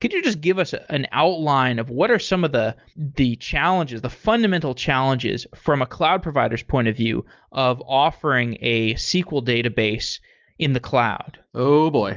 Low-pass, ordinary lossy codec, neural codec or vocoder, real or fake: 7.2 kHz; Opus, 32 kbps; none; real